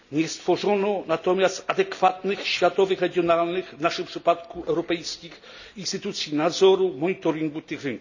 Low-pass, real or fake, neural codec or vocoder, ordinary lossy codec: 7.2 kHz; real; none; none